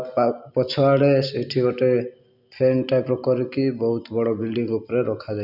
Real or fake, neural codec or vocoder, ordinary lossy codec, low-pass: real; none; none; 5.4 kHz